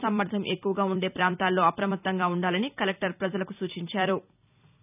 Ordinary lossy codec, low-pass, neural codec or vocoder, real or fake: none; 3.6 kHz; vocoder, 44.1 kHz, 128 mel bands every 256 samples, BigVGAN v2; fake